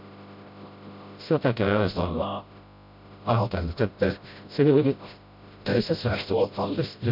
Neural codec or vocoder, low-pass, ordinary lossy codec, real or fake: codec, 16 kHz, 0.5 kbps, FreqCodec, smaller model; 5.4 kHz; MP3, 48 kbps; fake